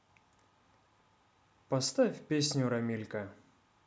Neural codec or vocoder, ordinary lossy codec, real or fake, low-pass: none; none; real; none